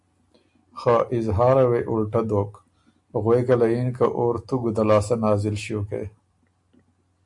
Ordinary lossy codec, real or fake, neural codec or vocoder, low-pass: AAC, 64 kbps; real; none; 10.8 kHz